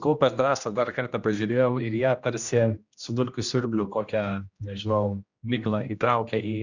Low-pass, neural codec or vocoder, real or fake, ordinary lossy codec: 7.2 kHz; codec, 16 kHz, 1 kbps, X-Codec, HuBERT features, trained on general audio; fake; Opus, 64 kbps